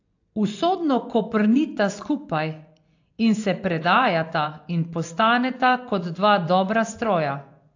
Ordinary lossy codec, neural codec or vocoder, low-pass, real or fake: AAC, 48 kbps; vocoder, 24 kHz, 100 mel bands, Vocos; 7.2 kHz; fake